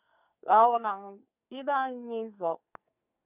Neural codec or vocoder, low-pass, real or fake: codec, 44.1 kHz, 2.6 kbps, SNAC; 3.6 kHz; fake